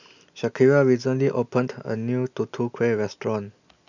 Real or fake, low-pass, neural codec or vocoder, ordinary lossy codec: real; 7.2 kHz; none; none